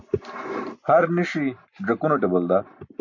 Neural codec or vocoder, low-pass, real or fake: none; 7.2 kHz; real